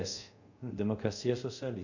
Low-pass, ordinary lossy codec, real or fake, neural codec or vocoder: 7.2 kHz; none; fake; codec, 24 kHz, 0.5 kbps, DualCodec